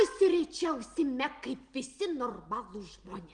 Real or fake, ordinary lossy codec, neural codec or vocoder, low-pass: real; Opus, 24 kbps; none; 9.9 kHz